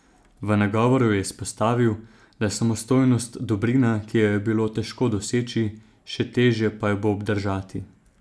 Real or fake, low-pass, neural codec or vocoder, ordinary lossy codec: real; none; none; none